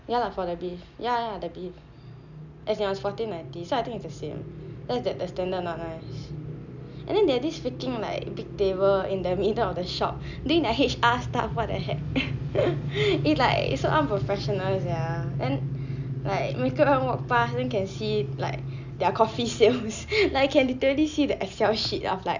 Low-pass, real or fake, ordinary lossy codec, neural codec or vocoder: 7.2 kHz; real; none; none